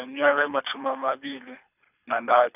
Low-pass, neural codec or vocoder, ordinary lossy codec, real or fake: 3.6 kHz; codec, 24 kHz, 3 kbps, HILCodec; none; fake